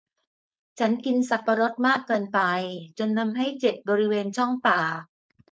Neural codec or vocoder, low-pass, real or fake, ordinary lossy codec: codec, 16 kHz, 4.8 kbps, FACodec; none; fake; none